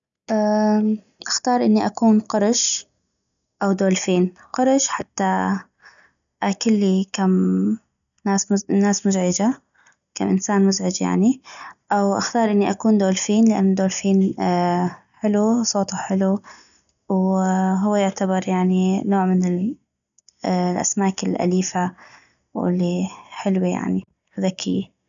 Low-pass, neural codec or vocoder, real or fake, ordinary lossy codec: 7.2 kHz; none; real; none